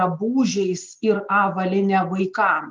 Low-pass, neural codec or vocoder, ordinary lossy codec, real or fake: 7.2 kHz; none; Opus, 32 kbps; real